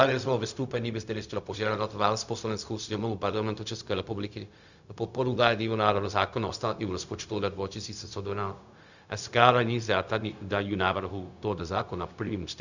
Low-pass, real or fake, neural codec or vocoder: 7.2 kHz; fake; codec, 16 kHz, 0.4 kbps, LongCat-Audio-Codec